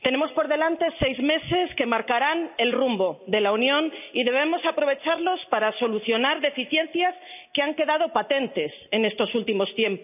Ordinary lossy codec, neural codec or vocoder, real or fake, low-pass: none; none; real; 3.6 kHz